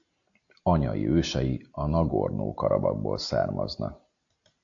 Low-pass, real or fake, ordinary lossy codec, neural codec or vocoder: 7.2 kHz; real; AAC, 64 kbps; none